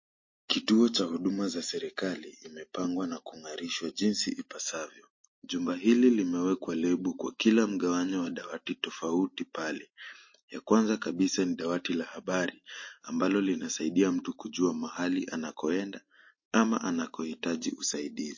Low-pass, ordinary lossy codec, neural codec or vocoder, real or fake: 7.2 kHz; MP3, 32 kbps; none; real